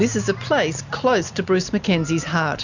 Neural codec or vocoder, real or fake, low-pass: none; real; 7.2 kHz